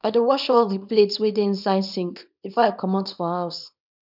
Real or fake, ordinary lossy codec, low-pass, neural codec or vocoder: fake; none; 5.4 kHz; codec, 24 kHz, 0.9 kbps, WavTokenizer, small release